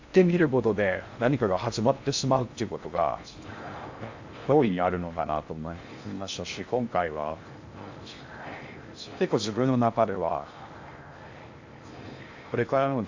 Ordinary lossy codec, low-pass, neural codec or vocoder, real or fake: AAC, 48 kbps; 7.2 kHz; codec, 16 kHz in and 24 kHz out, 0.6 kbps, FocalCodec, streaming, 4096 codes; fake